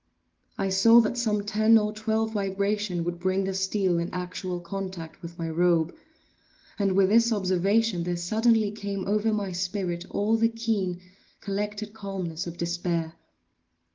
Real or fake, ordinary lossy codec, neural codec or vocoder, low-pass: real; Opus, 16 kbps; none; 7.2 kHz